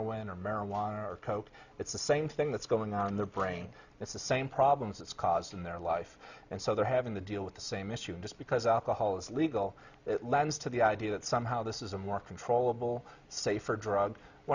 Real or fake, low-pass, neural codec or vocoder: real; 7.2 kHz; none